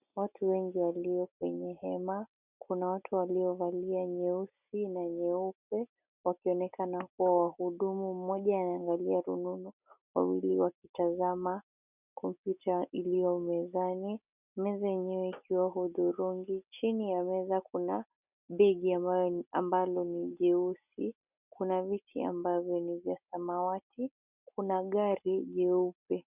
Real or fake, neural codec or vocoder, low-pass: real; none; 3.6 kHz